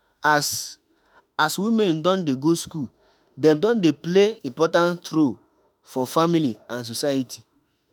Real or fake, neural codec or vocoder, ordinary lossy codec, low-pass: fake; autoencoder, 48 kHz, 32 numbers a frame, DAC-VAE, trained on Japanese speech; none; none